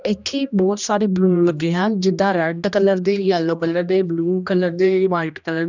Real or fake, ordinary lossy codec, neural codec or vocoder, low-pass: fake; none; codec, 16 kHz, 1 kbps, X-Codec, HuBERT features, trained on general audio; 7.2 kHz